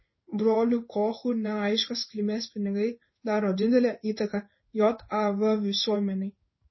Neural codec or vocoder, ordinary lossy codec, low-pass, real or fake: codec, 16 kHz in and 24 kHz out, 1 kbps, XY-Tokenizer; MP3, 24 kbps; 7.2 kHz; fake